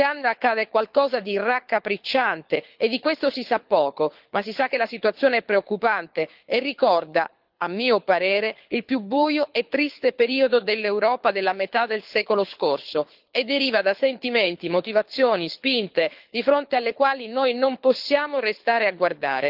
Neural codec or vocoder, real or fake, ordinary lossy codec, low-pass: codec, 24 kHz, 6 kbps, HILCodec; fake; Opus, 24 kbps; 5.4 kHz